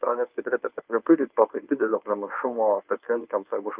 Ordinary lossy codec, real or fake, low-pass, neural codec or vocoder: Opus, 32 kbps; fake; 3.6 kHz; codec, 24 kHz, 0.9 kbps, WavTokenizer, medium speech release version 1